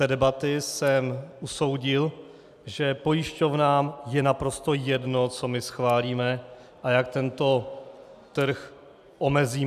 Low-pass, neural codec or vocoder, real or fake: 14.4 kHz; none; real